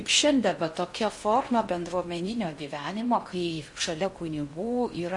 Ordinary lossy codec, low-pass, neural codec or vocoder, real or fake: MP3, 48 kbps; 10.8 kHz; codec, 16 kHz in and 24 kHz out, 0.6 kbps, FocalCodec, streaming, 2048 codes; fake